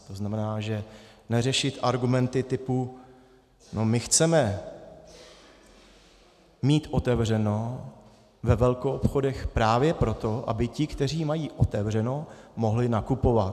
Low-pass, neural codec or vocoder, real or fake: 14.4 kHz; none; real